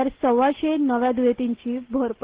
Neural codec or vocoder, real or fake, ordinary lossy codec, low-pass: none; real; Opus, 16 kbps; 3.6 kHz